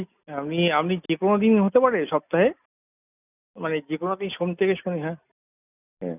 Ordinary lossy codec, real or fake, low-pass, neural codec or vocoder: none; real; 3.6 kHz; none